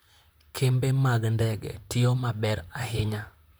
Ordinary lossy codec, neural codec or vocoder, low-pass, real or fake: none; vocoder, 44.1 kHz, 128 mel bands, Pupu-Vocoder; none; fake